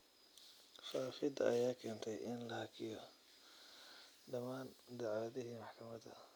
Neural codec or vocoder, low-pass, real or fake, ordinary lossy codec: none; none; real; none